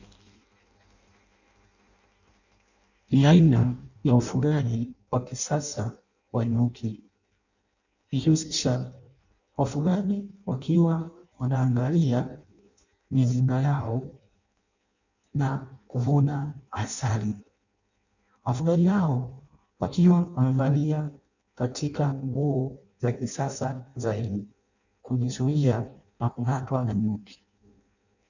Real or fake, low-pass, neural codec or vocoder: fake; 7.2 kHz; codec, 16 kHz in and 24 kHz out, 0.6 kbps, FireRedTTS-2 codec